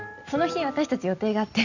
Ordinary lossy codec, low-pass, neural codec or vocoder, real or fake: none; 7.2 kHz; none; real